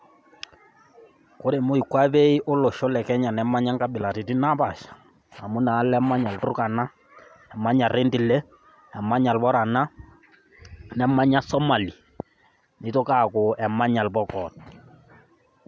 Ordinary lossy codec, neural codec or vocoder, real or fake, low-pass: none; none; real; none